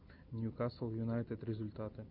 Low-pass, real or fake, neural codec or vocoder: 5.4 kHz; real; none